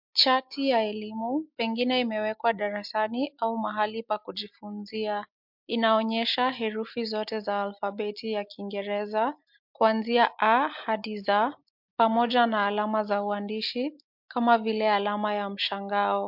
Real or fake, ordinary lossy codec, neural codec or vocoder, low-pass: real; MP3, 48 kbps; none; 5.4 kHz